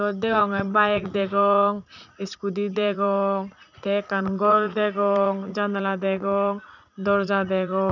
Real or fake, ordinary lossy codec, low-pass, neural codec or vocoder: fake; none; 7.2 kHz; vocoder, 44.1 kHz, 80 mel bands, Vocos